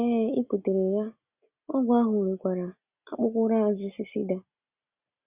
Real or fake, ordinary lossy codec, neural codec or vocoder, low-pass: real; Opus, 64 kbps; none; 3.6 kHz